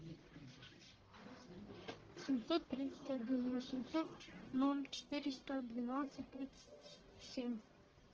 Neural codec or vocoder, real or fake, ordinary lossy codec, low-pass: codec, 44.1 kHz, 1.7 kbps, Pupu-Codec; fake; Opus, 16 kbps; 7.2 kHz